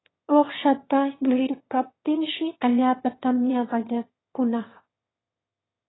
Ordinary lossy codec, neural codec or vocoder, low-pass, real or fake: AAC, 16 kbps; autoencoder, 22.05 kHz, a latent of 192 numbers a frame, VITS, trained on one speaker; 7.2 kHz; fake